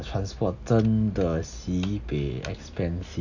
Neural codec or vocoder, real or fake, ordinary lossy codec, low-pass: none; real; none; 7.2 kHz